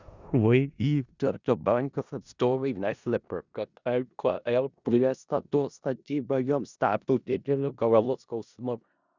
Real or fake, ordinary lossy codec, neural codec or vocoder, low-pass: fake; Opus, 64 kbps; codec, 16 kHz in and 24 kHz out, 0.4 kbps, LongCat-Audio-Codec, four codebook decoder; 7.2 kHz